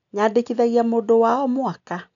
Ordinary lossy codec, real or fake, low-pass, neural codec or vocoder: none; real; 7.2 kHz; none